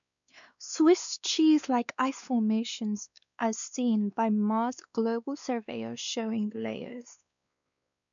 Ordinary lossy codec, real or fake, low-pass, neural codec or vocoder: none; fake; 7.2 kHz; codec, 16 kHz, 2 kbps, X-Codec, WavLM features, trained on Multilingual LibriSpeech